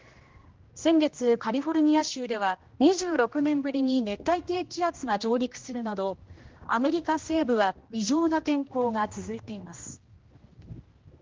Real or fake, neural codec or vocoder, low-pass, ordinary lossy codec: fake; codec, 16 kHz, 1 kbps, X-Codec, HuBERT features, trained on general audio; 7.2 kHz; Opus, 16 kbps